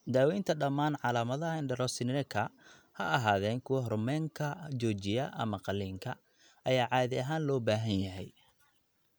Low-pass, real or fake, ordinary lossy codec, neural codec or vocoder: none; real; none; none